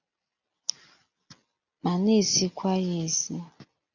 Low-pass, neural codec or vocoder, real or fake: 7.2 kHz; none; real